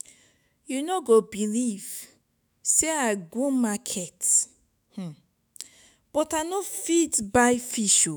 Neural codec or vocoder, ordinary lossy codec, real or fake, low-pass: autoencoder, 48 kHz, 128 numbers a frame, DAC-VAE, trained on Japanese speech; none; fake; none